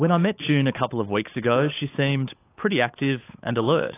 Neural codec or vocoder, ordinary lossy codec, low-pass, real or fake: codec, 16 kHz, 8 kbps, FunCodec, trained on Chinese and English, 25 frames a second; AAC, 24 kbps; 3.6 kHz; fake